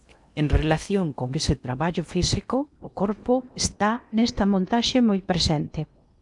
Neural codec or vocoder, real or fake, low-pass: codec, 16 kHz in and 24 kHz out, 0.8 kbps, FocalCodec, streaming, 65536 codes; fake; 10.8 kHz